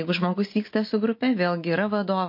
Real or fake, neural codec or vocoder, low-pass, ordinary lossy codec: real; none; 5.4 kHz; MP3, 32 kbps